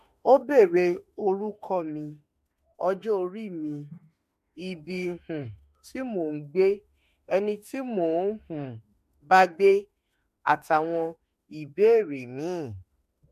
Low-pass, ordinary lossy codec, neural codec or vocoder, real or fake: 14.4 kHz; MP3, 64 kbps; autoencoder, 48 kHz, 32 numbers a frame, DAC-VAE, trained on Japanese speech; fake